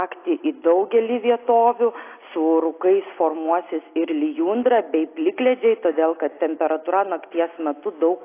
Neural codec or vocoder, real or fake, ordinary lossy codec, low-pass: none; real; AAC, 24 kbps; 3.6 kHz